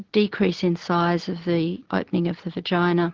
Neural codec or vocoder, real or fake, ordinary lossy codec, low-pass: none; real; Opus, 16 kbps; 7.2 kHz